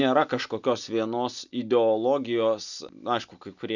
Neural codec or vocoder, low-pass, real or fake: none; 7.2 kHz; real